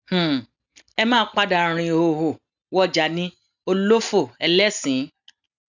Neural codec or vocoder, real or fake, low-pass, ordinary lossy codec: none; real; 7.2 kHz; none